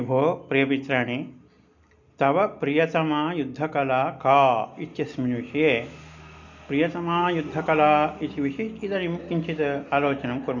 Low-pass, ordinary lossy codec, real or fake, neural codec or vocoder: 7.2 kHz; none; real; none